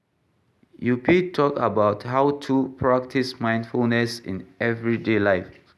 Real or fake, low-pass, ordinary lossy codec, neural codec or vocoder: real; none; none; none